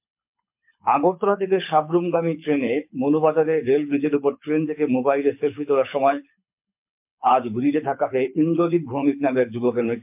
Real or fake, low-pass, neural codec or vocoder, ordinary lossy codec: fake; 3.6 kHz; codec, 24 kHz, 6 kbps, HILCodec; MP3, 32 kbps